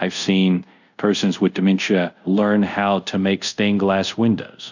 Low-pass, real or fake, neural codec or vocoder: 7.2 kHz; fake; codec, 24 kHz, 0.5 kbps, DualCodec